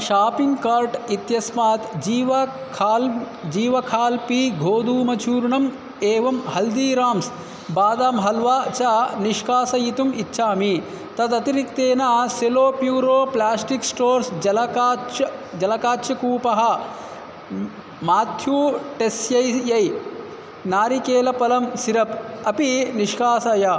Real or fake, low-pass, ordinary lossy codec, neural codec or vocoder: real; none; none; none